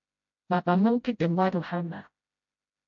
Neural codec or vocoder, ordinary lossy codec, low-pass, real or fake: codec, 16 kHz, 0.5 kbps, FreqCodec, smaller model; MP3, 48 kbps; 7.2 kHz; fake